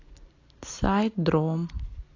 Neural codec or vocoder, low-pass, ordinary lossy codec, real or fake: none; 7.2 kHz; AAC, 32 kbps; real